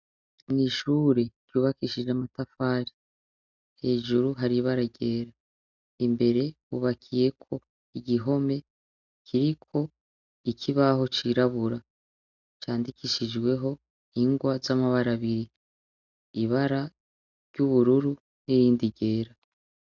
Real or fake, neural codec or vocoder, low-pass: real; none; 7.2 kHz